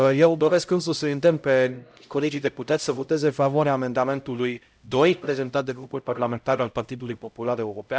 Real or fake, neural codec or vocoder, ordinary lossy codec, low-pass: fake; codec, 16 kHz, 0.5 kbps, X-Codec, HuBERT features, trained on LibriSpeech; none; none